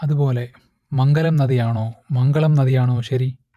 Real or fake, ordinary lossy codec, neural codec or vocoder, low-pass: fake; MP3, 96 kbps; vocoder, 44.1 kHz, 128 mel bands every 512 samples, BigVGAN v2; 14.4 kHz